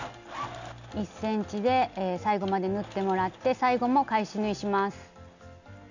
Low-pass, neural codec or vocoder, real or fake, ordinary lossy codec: 7.2 kHz; none; real; none